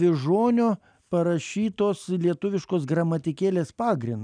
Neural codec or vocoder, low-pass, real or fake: none; 9.9 kHz; real